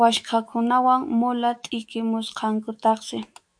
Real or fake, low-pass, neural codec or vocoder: fake; 9.9 kHz; autoencoder, 48 kHz, 128 numbers a frame, DAC-VAE, trained on Japanese speech